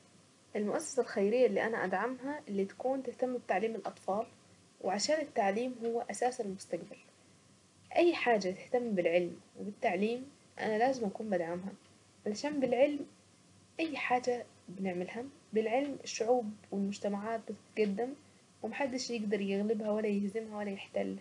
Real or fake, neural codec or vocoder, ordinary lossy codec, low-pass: real; none; none; 10.8 kHz